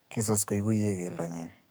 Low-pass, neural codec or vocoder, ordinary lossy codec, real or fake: none; codec, 44.1 kHz, 2.6 kbps, SNAC; none; fake